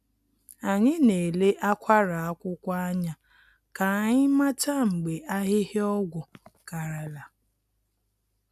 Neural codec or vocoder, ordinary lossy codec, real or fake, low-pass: none; none; real; 14.4 kHz